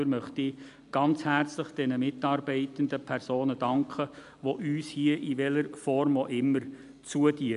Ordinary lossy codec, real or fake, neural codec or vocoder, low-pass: none; real; none; 10.8 kHz